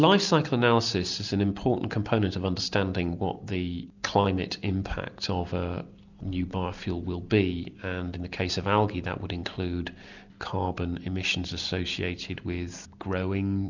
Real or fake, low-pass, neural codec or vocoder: real; 7.2 kHz; none